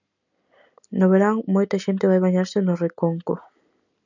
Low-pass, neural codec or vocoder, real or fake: 7.2 kHz; none; real